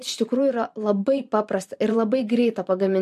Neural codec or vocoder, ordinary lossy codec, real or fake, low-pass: vocoder, 44.1 kHz, 128 mel bands every 512 samples, BigVGAN v2; MP3, 64 kbps; fake; 14.4 kHz